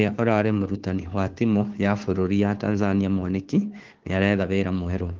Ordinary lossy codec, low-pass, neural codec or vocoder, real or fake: Opus, 16 kbps; 7.2 kHz; codec, 16 kHz, 2 kbps, FunCodec, trained on Chinese and English, 25 frames a second; fake